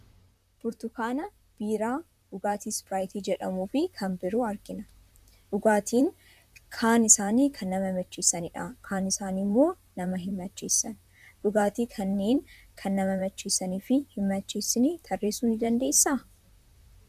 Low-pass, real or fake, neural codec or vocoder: 14.4 kHz; fake; vocoder, 44.1 kHz, 128 mel bands, Pupu-Vocoder